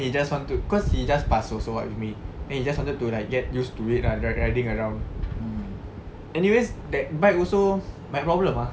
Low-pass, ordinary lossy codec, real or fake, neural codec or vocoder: none; none; real; none